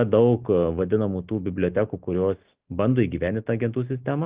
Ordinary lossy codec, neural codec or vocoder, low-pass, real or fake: Opus, 32 kbps; none; 3.6 kHz; real